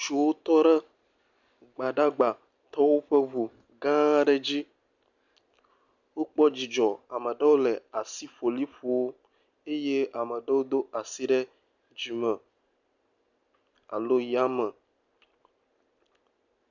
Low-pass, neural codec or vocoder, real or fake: 7.2 kHz; none; real